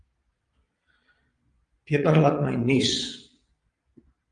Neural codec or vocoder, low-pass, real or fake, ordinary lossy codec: vocoder, 22.05 kHz, 80 mel bands, Vocos; 9.9 kHz; fake; Opus, 32 kbps